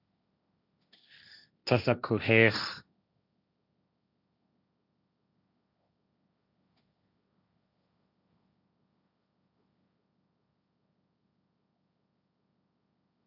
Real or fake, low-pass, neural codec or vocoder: fake; 5.4 kHz; codec, 16 kHz, 1.1 kbps, Voila-Tokenizer